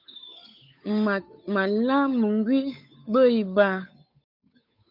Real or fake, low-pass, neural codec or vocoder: fake; 5.4 kHz; codec, 16 kHz, 8 kbps, FunCodec, trained on Chinese and English, 25 frames a second